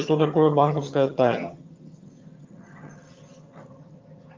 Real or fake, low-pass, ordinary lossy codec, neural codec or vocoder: fake; 7.2 kHz; Opus, 24 kbps; vocoder, 22.05 kHz, 80 mel bands, HiFi-GAN